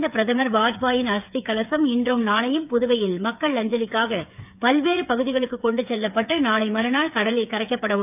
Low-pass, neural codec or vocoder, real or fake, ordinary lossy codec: 3.6 kHz; codec, 16 kHz, 8 kbps, FreqCodec, smaller model; fake; none